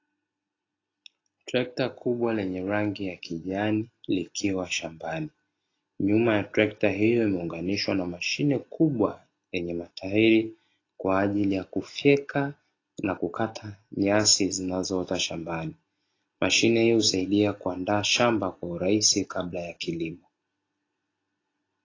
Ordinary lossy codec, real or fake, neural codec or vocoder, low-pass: AAC, 32 kbps; real; none; 7.2 kHz